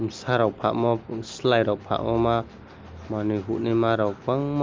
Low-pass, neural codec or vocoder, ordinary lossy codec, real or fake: 7.2 kHz; none; Opus, 24 kbps; real